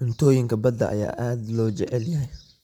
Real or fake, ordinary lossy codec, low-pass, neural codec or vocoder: real; none; 19.8 kHz; none